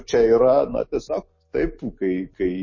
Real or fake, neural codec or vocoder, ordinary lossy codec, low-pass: real; none; MP3, 32 kbps; 7.2 kHz